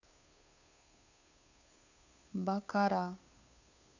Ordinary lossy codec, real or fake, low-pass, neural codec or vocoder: none; fake; 7.2 kHz; codec, 16 kHz, 2 kbps, FunCodec, trained on Chinese and English, 25 frames a second